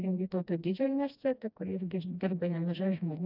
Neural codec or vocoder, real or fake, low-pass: codec, 16 kHz, 1 kbps, FreqCodec, smaller model; fake; 5.4 kHz